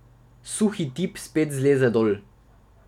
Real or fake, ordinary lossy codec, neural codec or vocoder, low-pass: real; none; none; 19.8 kHz